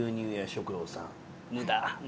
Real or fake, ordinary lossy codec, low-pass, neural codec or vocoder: real; none; none; none